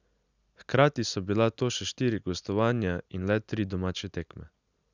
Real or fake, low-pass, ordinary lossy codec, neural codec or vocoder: real; 7.2 kHz; none; none